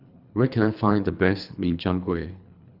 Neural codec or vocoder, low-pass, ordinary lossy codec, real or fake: codec, 24 kHz, 3 kbps, HILCodec; 5.4 kHz; Opus, 64 kbps; fake